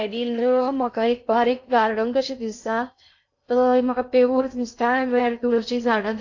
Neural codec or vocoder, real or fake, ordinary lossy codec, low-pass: codec, 16 kHz in and 24 kHz out, 0.6 kbps, FocalCodec, streaming, 4096 codes; fake; AAC, 48 kbps; 7.2 kHz